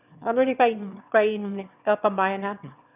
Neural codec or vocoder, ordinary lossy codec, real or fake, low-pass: autoencoder, 22.05 kHz, a latent of 192 numbers a frame, VITS, trained on one speaker; none; fake; 3.6 kHz